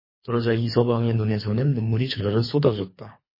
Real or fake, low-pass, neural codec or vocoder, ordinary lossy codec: fake; 5.4 kHz; codec, 24 kHz, 3 kbps, HILCodec; MP3, 24 kbps